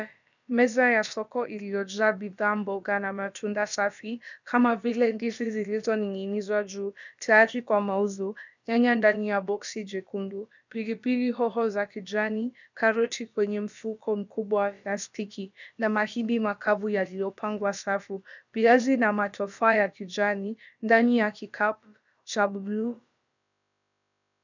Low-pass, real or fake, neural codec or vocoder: 7.2 kHz; fake; codec, 16 kHz, about 1 kbps, DyCAST, with the encoder's durations